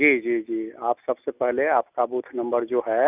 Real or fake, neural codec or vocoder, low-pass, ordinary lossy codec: real; none; 3.6 kHz; none